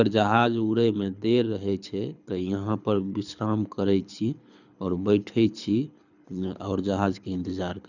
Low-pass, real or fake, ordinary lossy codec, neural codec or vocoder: 7.2 kHz; fake; none; codec, 24 kHz, 6 kbps, HILCodec